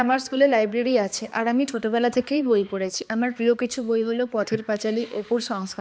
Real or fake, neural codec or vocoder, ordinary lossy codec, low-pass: fake; codec, 16 kHz, 2 kbps, X-Codec, HuBERT features, trained on balanced general audio; none; none